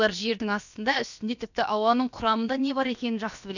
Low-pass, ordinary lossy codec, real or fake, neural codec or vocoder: 7.2 kHz; none; fake; codec, 16 kHz, about 1 kbps, DyCAST, with the encoder's durations